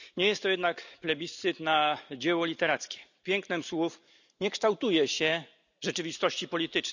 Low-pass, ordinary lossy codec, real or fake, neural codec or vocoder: 7.2 kHz; none; real; none